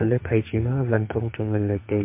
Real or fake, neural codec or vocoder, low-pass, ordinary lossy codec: fake; codec, 44.1 kHz, 2.6 kbps, SNAC; 3.6 kHz; MP3, 24 kbps